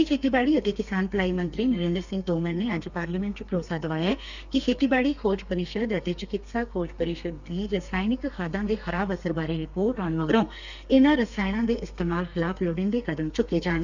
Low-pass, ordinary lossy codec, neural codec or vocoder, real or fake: 7.2 kHz; none; codec, 32 kHz, 1.9 kbps, SNAC; fake